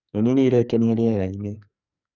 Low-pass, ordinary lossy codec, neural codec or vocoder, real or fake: 7.2 kHz; none; codec, 44.1 kHz, 2.6 kbps, SNAC; fake